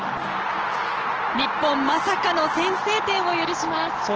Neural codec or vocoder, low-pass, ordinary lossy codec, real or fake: none; 7.2 kHz; Opus, 16 kbps; real